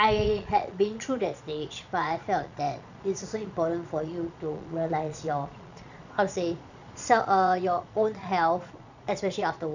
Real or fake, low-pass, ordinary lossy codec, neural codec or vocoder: fake; 7.2 kHz; none; vocoder, 22.05 kHz, 80 mel bands, Vocos